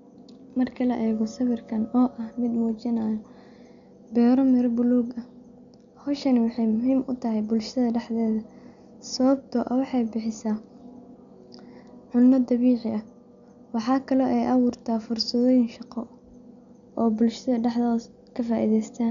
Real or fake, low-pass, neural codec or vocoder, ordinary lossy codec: real; 7.2 kHz; none; none